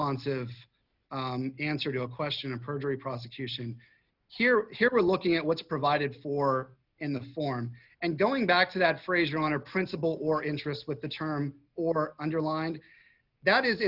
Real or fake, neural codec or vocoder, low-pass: real; none; 5.4 kHz